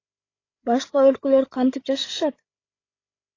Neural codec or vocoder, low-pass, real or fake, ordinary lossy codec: codec, 16 kHz, 8 kbps, FreqCodec, larger model; 7.2 kHz; fake; AAC, 32 kbps